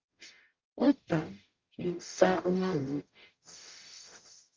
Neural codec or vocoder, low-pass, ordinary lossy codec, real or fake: codec, 44.1 kHz, 0.9 kbps, DAC; 7.2 kHz; Opus, 32 kbps; fake